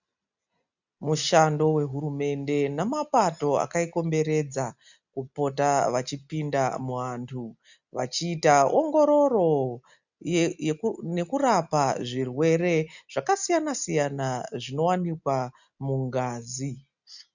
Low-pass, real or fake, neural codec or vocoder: 7.2 kHz; real; none